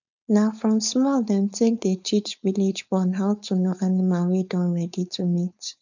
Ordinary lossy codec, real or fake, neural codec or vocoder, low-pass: none; fake; codec, 16 kHz, 4.8 kbps, FACodec; 7.2 kHz